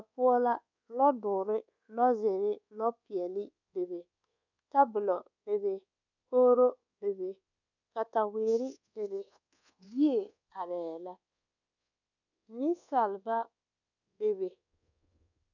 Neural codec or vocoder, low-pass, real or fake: codec, 24 kHz, 1.2 kbps, DualCodec; 7.2 kHz; fake